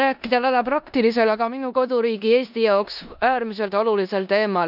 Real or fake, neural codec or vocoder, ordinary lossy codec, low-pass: fake; codec, 16 kHz in and 24 kHz out, 0.9 kbps, LongCat-Audio-Codec, four codebook decoder; none; 5.4 kHz